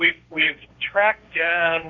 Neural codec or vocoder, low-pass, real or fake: codec, 16 kHz, 1.1 kbps, Voila-Tokenizer; 7.2 kHz; fake